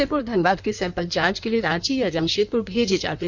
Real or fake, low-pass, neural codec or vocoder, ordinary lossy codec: fake; 7.2 kHz; codec, 16 kHz in and 24 kHz out, 1.1 kbps, FireRedTTS-2 codec; none